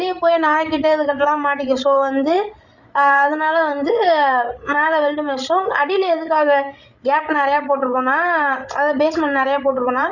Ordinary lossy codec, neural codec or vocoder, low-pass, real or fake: Opus, 64 kbps; codec, 16 kHz, 16 kbps, FreqCodec, larger model; 7.2 kHz; fake